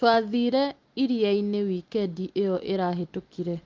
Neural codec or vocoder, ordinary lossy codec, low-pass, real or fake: none; Opus, 32 kbps; 7.2 kHz; real